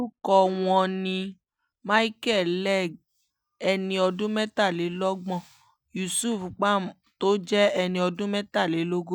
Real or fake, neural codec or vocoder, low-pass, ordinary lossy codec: real; none; 19.8 kHz; none